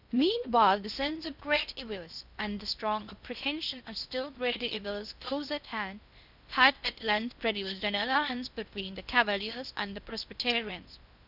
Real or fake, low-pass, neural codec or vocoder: fake; 5.4 kHz; codec, 16 kHz in and 24 kHz out, 0.6 kbps, FocalCodec, streaming, 2048 codes